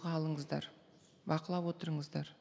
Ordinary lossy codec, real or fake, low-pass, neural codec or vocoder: none; real; none; none